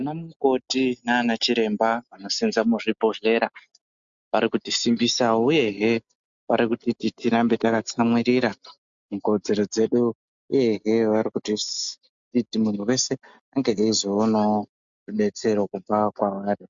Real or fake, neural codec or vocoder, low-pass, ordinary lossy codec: real; none; 7.2 kHz; MP3, 64 kbps